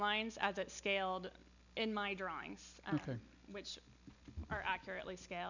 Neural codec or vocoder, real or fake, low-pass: none; real; 7.2 kHz